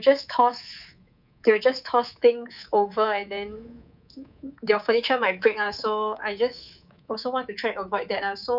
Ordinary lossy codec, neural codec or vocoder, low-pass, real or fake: none; codec, 24 kHz, 3.1 kbps, DualCodec; 5.4 kHz; fake